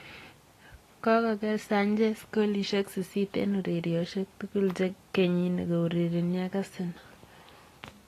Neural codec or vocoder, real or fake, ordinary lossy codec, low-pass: none; real; AAC, 48 kbps; 14.4 kHz